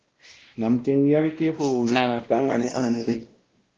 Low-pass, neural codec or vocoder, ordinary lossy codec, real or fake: 7.2 kHz; codec, 16 kHz, 1 kbps, X-Codec, WavLM features, trained on Multilingual LibriSpeech; Opus, 16 kbps; fake